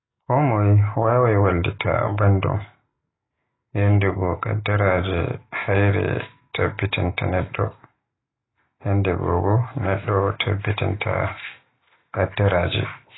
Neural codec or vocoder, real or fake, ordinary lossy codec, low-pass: none; real; AAC, 16 kbps; 7.2 kHz